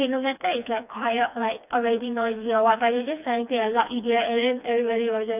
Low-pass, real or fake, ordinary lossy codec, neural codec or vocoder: 3.6 kHz; fake; none; codec, 16 kHz, 2 kbps, FreqCodec, smaller model